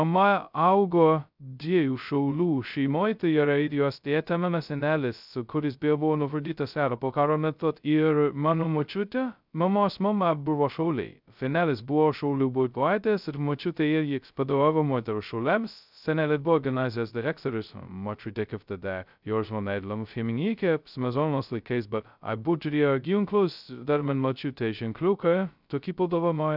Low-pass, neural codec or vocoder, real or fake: 5.4 kHz; codec, 16 kHz, 0.2 kbps, FocalCodec; fake